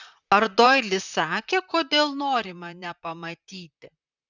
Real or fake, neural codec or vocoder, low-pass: fake; vocoder, 44.1 kHz, 128 mel bands, Pupu-Vocoder; 7.2 kHz